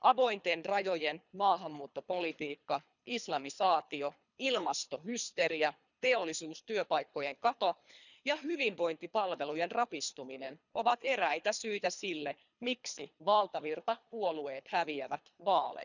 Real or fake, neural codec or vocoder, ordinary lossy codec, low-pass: fake; codec, 24 kHz, 3 kbps, HILCodec; none; 7.2 kHz